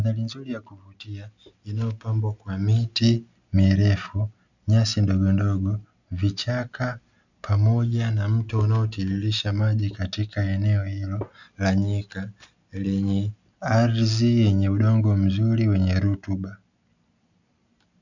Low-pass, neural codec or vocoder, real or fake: 7.2 kHz; none; real